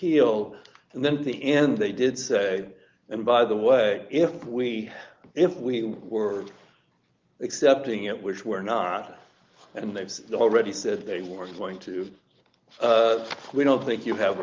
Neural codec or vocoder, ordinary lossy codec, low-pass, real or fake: none; Opus, 32 kbps; 7.2 kHz; real